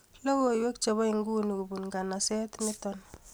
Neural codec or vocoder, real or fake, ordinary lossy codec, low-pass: none; real; none; none